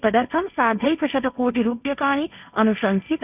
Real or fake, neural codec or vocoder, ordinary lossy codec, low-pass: fake; codec, 16 kHz, 1.1 kbps, Voila-Tokenizer; none; 3.6 kHz